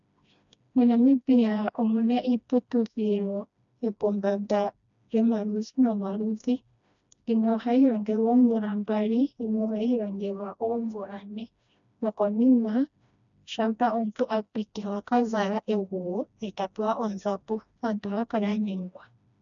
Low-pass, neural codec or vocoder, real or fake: 7.2 kHz; codec, 16 kHz, 1 kbps, FreqCodec, smaller model; fake